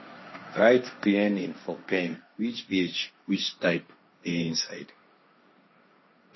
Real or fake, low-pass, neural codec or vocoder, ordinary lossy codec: fake; 7.2 kHz; codec, 16 kHz, 1.1 kbps, Voila-Tokenizer; MP3, 24 kbps